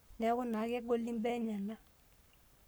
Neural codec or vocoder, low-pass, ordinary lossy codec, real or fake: codec, 44.1 kHz, 7.8 kbps, Pupu-Codec; none; none; fake